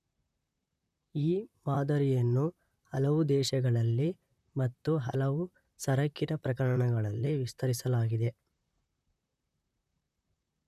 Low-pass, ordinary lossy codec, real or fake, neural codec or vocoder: 14.4 kHz; none; fake; vocoder, 44.1 kHz, 128 mel bands, Pupu-Vocoder